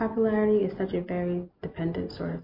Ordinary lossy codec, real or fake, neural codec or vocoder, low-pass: MP3, 32 kbps; real; none; 5.4 kHz